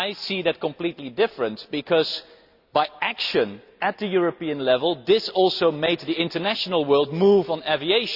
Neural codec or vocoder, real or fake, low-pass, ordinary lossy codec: none; real; 5.4 kHz; Opus, 64 kbps